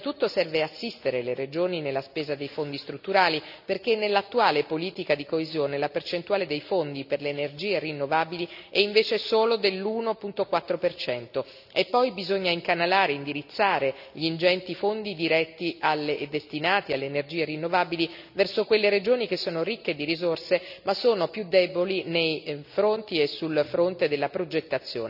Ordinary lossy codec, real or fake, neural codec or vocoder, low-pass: none; real; none; 5.4 kHz